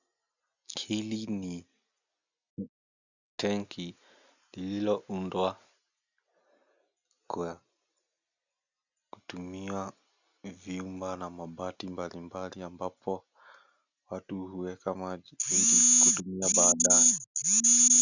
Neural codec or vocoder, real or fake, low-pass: none; real; 7.2 kHz